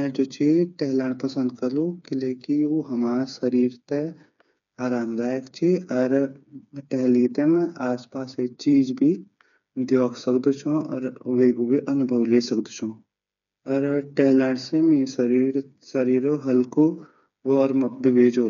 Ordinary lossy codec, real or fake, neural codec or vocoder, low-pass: none; fake; codec, 16 kHz, 4 kbps, FreqCodec, smaller model; 7.2 kHz